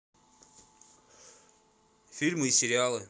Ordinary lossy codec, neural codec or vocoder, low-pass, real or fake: none; none; none; real